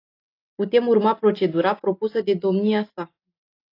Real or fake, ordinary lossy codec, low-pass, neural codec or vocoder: real; AAC, 32 kbps; 5.4 kHz; none